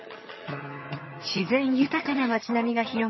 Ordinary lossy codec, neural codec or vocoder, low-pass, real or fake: MP3, 24 kbps; vocoder, 22.05 kHz, 80 mel bands, HiFi-GAN; 7.2 kHz; fake